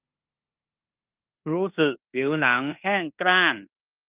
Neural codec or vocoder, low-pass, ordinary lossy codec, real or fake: codec, 16 kHz in and 24 kHz out, 0.9 kbps, LongCat-Audio-Codec, fine tuned four codebook decoder; 3.6 kHz; Opus, 24 kbps; fake